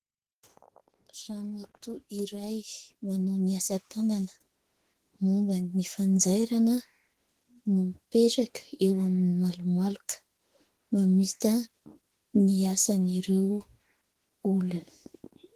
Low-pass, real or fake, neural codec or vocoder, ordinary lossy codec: 14.4 kHz; fake; autoencoder, 48 kHz, 32 numbers a frame, DAC-VAE, trained on Japanese speech; Opus, 16 kbps